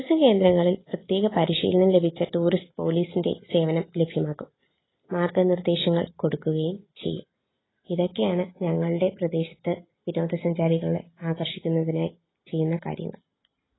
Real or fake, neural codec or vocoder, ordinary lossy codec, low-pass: real; none; AAC, 16 kbps; 7.2 kHz